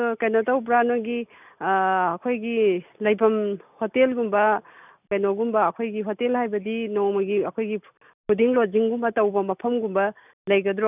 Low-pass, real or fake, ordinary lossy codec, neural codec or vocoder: 3.6 kHz; real; none; none